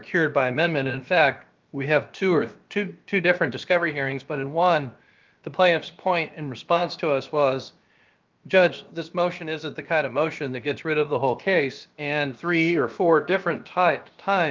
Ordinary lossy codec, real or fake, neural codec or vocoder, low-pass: Opus, 24 kbps; fake; codec, 16 kHz, about 1 kbps, DyCAST, with the encoder's durations; 7.2 kHz